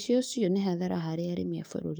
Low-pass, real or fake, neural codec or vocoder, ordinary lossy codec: none; fake; codec, 44.1 kHz, 7.8 kbps, DAC; none